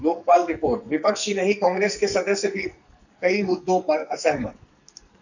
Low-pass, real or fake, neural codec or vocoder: 7.2 kHz; fake; codec, 44.1 kHz, 2.6 kbps, SNAC